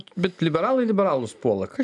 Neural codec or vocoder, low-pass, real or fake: none; 10.8 kHz; real